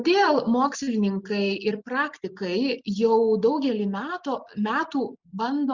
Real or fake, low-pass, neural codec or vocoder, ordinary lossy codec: real; 7.2 kHz; none; Opus, 64 kbps